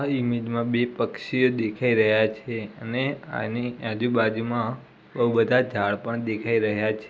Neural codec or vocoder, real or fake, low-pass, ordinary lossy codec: none; real; none; none